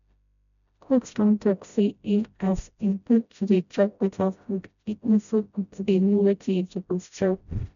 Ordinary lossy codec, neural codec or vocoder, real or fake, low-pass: none; codec, 16 kHz, 0.5 kbps, FreqCodec, smaller model; fake; 7.2 kHz